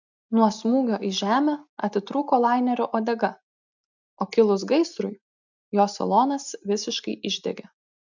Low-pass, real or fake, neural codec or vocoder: 7.2 kHz; real; none